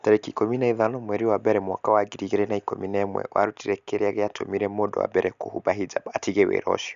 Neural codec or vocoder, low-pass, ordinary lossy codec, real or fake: none; 7.2 kHz; Opus, 64 kbps; real